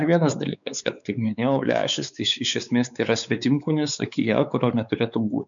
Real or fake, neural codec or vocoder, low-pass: fake; codec, 16 kHz, 4 kbps, X-Codec, WavLM features, trained on Multilingual LibriSpeech; 7.2 kHz